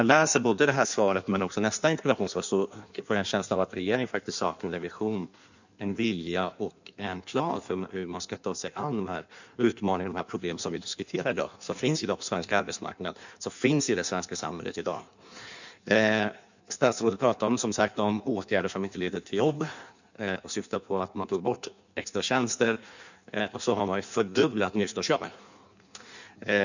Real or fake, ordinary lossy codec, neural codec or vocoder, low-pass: fake; none; codec, 16 kHz in and 24 kHz out, 1.1 kbps, FireRedTTS-2 codec; 7.2 kHz